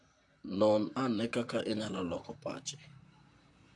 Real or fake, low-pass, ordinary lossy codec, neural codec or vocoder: fake; 10.8 kHz; AAC, 64 kbps; vocoder, 44.1 kHz, 128 mel bands, Pupu-Vocoder